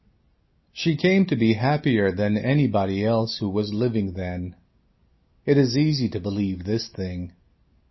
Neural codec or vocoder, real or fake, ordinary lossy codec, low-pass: none; real; MP3, 24 kbps; 7.2 kHz